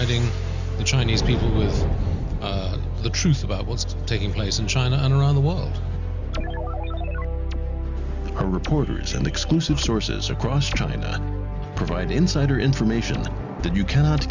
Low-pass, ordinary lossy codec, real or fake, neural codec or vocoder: 7.2 kHz; Opus, 64 kbps; real; none